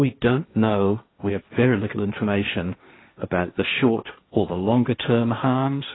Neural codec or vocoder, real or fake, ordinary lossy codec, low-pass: codec, 16 kHz, 1.1 kbps, Voila-Tokenizer; fake; AAC, 16 kbps; 7.2 kHz